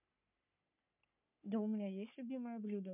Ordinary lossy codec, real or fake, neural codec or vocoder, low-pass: MP3, 32 kbps; fake; codec, 44.1 kHz, 3.4 kbps, Pupu-Codec; 3.6 kHz